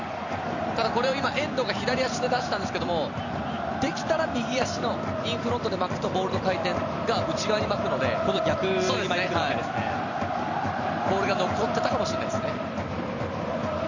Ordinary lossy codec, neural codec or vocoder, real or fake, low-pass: none; vocoder, 44.1 kHz, 128 mel bands every 256 samples, BigVGAN v2; fake; 7.2 kHz